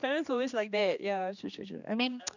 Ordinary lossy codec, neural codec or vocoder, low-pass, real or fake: none; codec, 16 kHz, 2 kbps, X-Codec, HuBERT features, trained on general audio; 7.2 kHz; fake